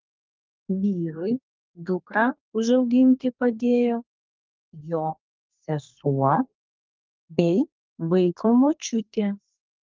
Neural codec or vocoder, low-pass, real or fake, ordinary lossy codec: codec, 32 kHz, 1.9 kbps, SNAC; 7.2 kHz; fake; Opus, 24 kbps